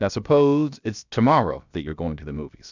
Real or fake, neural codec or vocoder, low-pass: fake; codec, 16 kHz, about 1 kbps, DyCAST, with the encoder's durations; 7.2 kHz